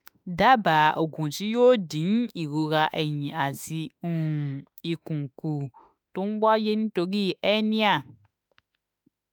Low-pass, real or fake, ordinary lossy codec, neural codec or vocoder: none; fake; none; autoencoder, 48 kHz, 32 numbers a frame, DAC-VAE, trained on Japanese speech